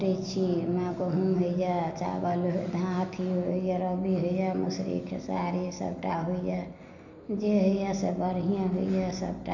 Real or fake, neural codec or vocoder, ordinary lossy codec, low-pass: real; none; none; 7.2 kHz